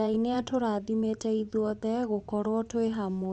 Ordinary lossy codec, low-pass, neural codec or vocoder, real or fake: none; 9.9 kHz; vocoder, 24 kHz, 100 mel bands, Vocos; fake